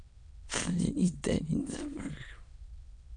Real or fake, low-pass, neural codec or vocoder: fake; 9.9 kHz; autoencoder, 22.05 kHz, a latent of 192 numbers a frame, VITS, trained on many speakers